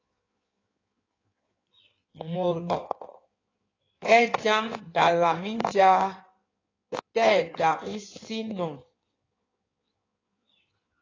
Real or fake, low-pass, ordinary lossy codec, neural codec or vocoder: fake; 7.2 kHz; AAC, 32 kbps; codec, 16 kHz in and 24 kHz out, 1.1 kbps, FireRedTTS-2 codec